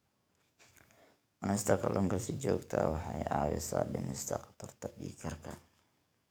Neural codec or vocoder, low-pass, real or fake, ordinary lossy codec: codec, 44.1 kHz, 7.8 kbps, DAC; none; fake; none